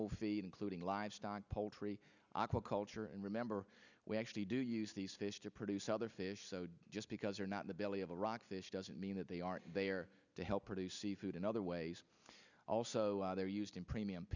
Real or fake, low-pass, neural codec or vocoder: real; 7.2 kHz; none